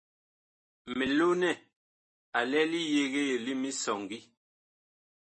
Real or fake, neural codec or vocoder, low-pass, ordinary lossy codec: real; none; 10.8 kHz; MP3, 32 kbps